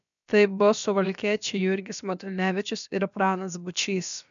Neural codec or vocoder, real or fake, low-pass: codec, 16 kHz, about 1 kbps, DyCAST, with the encoder's durations; fake; 7.2 kHz